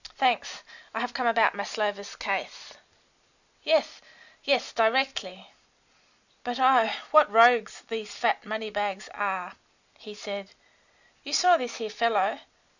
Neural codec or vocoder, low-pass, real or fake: none; 7.2 kHz; real